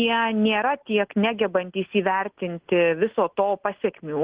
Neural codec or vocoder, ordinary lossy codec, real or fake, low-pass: none; Opus, 16 kbps; real; 3.6 kHz